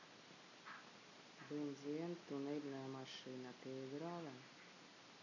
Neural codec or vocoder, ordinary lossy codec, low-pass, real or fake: none; none; 7.2 kHz; real